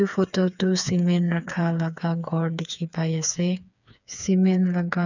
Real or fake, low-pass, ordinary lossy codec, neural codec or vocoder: fake; 7.2 kHz; none; codec, 16 kHz, 4 kbps, FreqCodec, smaller model